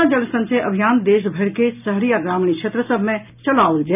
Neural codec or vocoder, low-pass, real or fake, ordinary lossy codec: none; 3.6 kHz; real; none